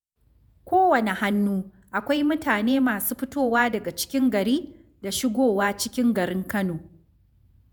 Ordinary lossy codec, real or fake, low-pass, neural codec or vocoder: none; fake; none; vocoder, 48 kHz, 128 mel bands, Vocos